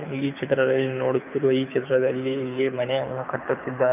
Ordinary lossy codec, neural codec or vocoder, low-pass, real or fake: none; codec, 24 kHz, 6 kbps, HILCodec; 3.6 kHz; fake